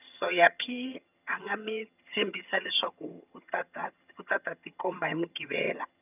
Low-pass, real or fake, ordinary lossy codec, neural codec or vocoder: 3.6 kHz; fake; none; vocoder, 22.05 kHz, 80 mel bands, HiFi-GAN